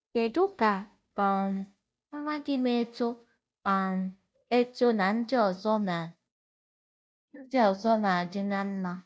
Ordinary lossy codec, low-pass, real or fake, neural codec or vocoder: none; none; fake; codec, 16 kHz, 0.5 kbps, FunCodec, trained on Chinese and English, 25 frames a second